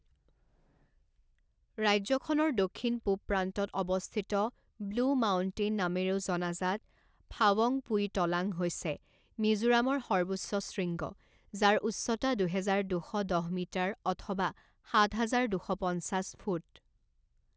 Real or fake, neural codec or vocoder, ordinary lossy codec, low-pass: real; none; none; none